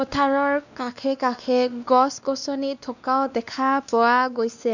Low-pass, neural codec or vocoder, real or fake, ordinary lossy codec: 7.2 kHz; codec, 16 kHz, 2 kbps, X-Codec, WavLM features, trained on Multilingual LibriSpeech; fake; none